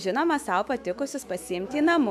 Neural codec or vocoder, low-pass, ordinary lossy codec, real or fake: autoencoder, 48 kHz, 128 numbers a frame, DAC-VAE, trained on Japanese speech; 14.4 kHz; AAC, 96 kbps; fake